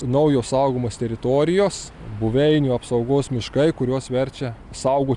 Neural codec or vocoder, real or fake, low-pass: none; real; 10.8 kHz